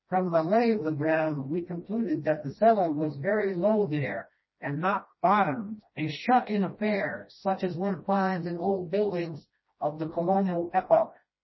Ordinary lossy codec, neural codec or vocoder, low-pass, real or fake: MP3, 24 kbps; codec, 16 kHz, 1 kbps, FreqCodec, smaller model; 7.2 kHz; fake